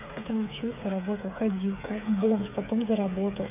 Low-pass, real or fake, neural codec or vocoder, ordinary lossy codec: 3.6 kHz; fake; codec, 16 kHz, 8 kbps, FreqCodec, smaller model; none